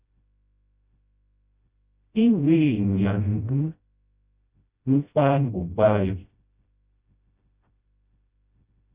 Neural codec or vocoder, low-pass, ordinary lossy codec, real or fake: codec, 16 kHz, 0.5 kbps, FreqCodec, smaller model; 3.6 kHz; Opus, 64 kbps; fake